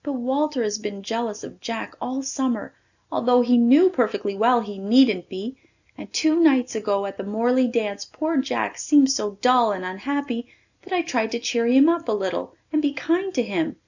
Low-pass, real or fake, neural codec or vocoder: 7.2 kHz; real; none